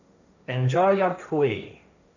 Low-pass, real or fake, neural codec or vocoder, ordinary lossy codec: 7.2 kHz; fake; codec, 16 kHz, 1.1 kbps, Voila-Tokenizer; none